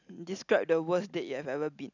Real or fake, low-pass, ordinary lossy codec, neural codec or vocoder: real; 7.2 kHz; none; none